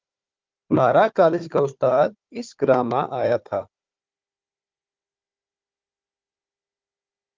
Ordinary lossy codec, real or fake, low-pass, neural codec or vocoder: Opus, 32 kbps; fake; 7.2 kHz; codec, 16 kHz, 4 kbps, FunCodec, trained on Chinese and English, 50 frames a second